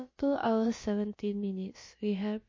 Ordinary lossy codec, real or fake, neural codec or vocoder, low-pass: MP3, 32 kbps; fake; codec, 16 kHz, about 1 kbps, DyCAST, with the encoder's durations; 7.2 kHz